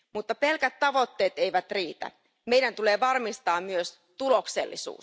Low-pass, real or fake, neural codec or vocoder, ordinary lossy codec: none; real; none; none